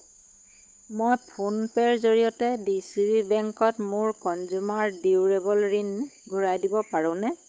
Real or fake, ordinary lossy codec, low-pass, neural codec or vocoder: fake; none; none; codec, 16 kHz, 8 kbps, FunCodec, trained on Chinese and English, 25 frames a second